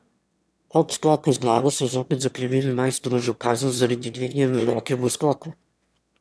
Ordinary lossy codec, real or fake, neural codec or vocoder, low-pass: none; fake; autoencoder, 22.05 kHz, a latent of 192 numbers a frame, VITS, trained on one speaker; none